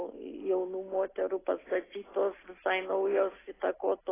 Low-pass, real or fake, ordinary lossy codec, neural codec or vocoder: 3.6 kHz; real; AAC, 16 kbps; none